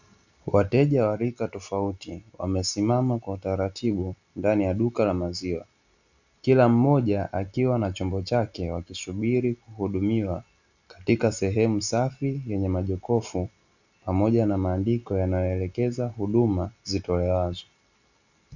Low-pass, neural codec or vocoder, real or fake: 7.2 kHz; none; real